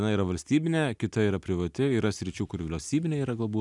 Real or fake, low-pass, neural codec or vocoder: real; 10.8 kHz; none